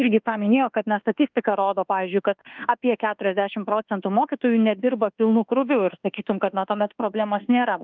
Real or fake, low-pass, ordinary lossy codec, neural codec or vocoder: fake; 7.2 kHz; Opus, 32 kbps; codec, 24 kHz, 1.2 kbps, DualCodec